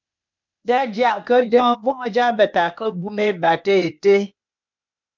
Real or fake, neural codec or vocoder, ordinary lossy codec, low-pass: fake; codec, 16 kHz, 0.8 kbps, ZipCodec; MP3, 64 kbps; 7.2 kHz